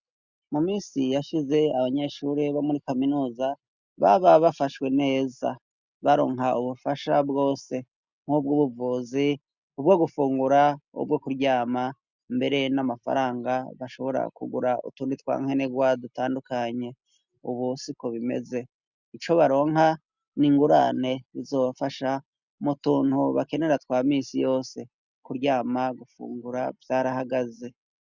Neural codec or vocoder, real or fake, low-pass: none; real; 7.2 kHz